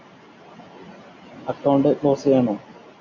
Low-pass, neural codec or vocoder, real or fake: 7.2 kHz; none; real